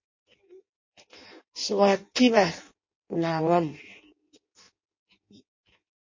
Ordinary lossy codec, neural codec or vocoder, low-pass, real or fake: MP3, 32 kbps; codec, 16 kHz in and 24 kHz out, 0.6 kbps, FireRedTTS-2 codec; 7.2 kHz; fake